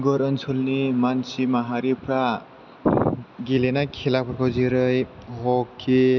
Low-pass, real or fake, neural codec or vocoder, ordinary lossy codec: 7.2 kHz; real; none; none